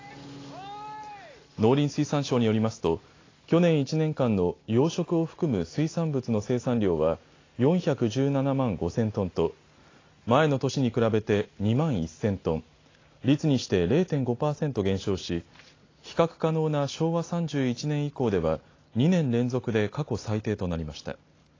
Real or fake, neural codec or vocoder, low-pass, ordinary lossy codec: real; none; 7.2 kHz; AAC, 32 kbps